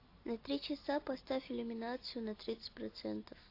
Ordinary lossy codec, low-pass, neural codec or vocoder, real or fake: AAC, 32 kbps; 5.4 kHz; none; real